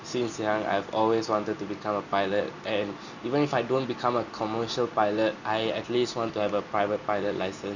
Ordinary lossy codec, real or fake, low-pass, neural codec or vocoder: none; real; 7.2 kHz; none